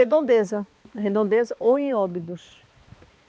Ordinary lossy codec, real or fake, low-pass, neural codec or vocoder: none; fake; none; codec, 16 kHz, 2 kbps, X-Codec, HuBERT features, trained on balanced general audio